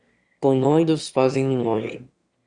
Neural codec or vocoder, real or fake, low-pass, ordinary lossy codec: autoencoder, 22.05 kHz, a latent of 192 numbers a frame, VITS, trained on one speaker; fake; 9.9 kHz; Opus, 64 kbps